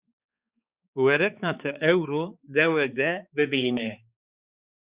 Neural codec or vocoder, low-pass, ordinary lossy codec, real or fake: codec, 16 kHz, 4 kbps, X-Codec, HuBERT features, trained on balanced general audio; 3.6 kHz; Opus, 64 kbps; fake